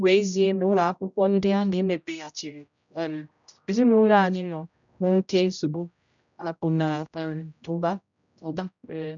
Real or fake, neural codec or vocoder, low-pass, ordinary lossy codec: fake; codec, 16 kHz, 0.5 kbps, X-Codec, HuBERT features, trained on general audio; 7.2 kHz; none